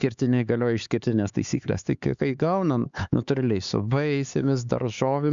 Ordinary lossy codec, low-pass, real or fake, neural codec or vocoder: Opus, 64 kbps; 7.2 kHz; fake; codec, 16 kHz, 4 kbps, X-Codec, HuBERT features, trained on LibriSpeech